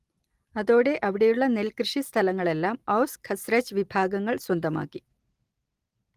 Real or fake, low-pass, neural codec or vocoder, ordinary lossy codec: real; 14.4 kHz; none; Opus, 24 kbps